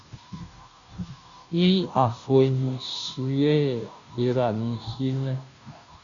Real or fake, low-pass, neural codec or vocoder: fake; 7.2 kHz; codec, 16 kHz, 0.5 kbps, FunCodec, trained on Chinese and English, 25 frames a second